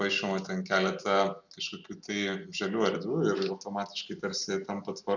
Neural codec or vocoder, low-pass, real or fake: none; 7.2 kHz; real